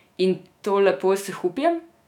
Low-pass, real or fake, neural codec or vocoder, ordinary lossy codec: 19.8 kHz; fake; autoencoder, 48 kHz, 128 numbers a frame, DAC-VAE, trained on Japanese speech; none